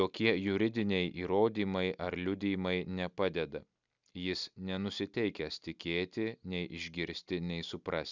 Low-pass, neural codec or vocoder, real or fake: 7.2 kHz; none; real